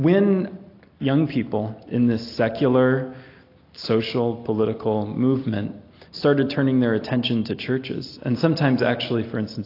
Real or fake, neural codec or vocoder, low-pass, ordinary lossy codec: real; none; 5.4 kHz; AAC, 32 kbps